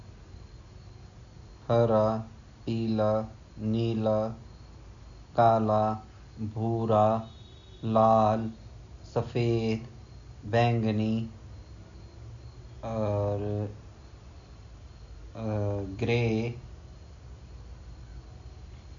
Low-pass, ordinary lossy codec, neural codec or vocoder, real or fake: 7.2 kHz; none; none; real